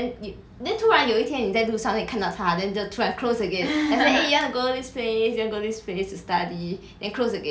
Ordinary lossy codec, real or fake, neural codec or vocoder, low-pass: none; real; none; none